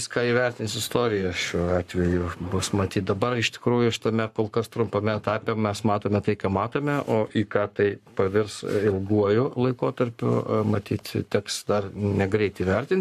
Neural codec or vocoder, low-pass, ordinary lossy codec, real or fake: codec, 44.1 kHz, 7.8 kbps, Pupu-Codec; 14.4 kHz; MP3, 96 kbps; fake